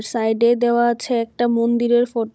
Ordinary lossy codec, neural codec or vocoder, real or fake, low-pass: none; codec, 16 kHz, 16 kbps, FunCodec, trained on Chinese and English, 50 frames a second; fake; none